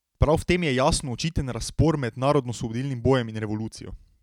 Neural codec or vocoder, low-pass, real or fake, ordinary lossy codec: none; 19.8 kHz; real; none